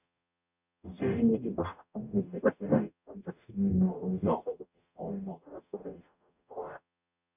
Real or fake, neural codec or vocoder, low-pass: fake; codec, 44.1 kHz, 0.9 kbps, DAC; 3.6 kHz